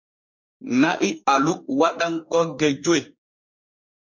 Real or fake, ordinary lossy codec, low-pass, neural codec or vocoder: fake; MP3, 48 kbps; 7.2 kHz; codec, 44.1 kHz, 2.6 kbps, DAC